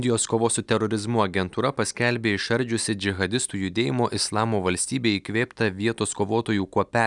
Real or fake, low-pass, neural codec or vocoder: real; 10.8 kHz; none